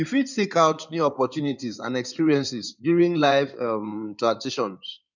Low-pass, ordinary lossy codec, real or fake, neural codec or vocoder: 7.2 kHz; none; fake; codec, 16 kHz in and 24 kHz out, 2.2 kbps, FireRedTTS-2 codec